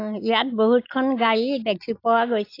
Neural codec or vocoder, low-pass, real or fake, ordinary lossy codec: none; 5.4 kHz; real; AAC, 32 kbps